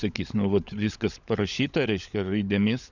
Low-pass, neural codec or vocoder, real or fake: 7.2 kHz; codec, 16 kHz, 16 kbps, FunCodec, trained on LibriTTS, 50 frames a second; fake